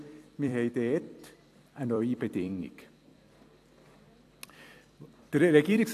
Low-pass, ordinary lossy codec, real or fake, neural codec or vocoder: 14.4 kHz; AAC, 64 kbps; fake; vocoder, 44.1 kHz, 128 mel bands every 256 samples, BigVGAN v2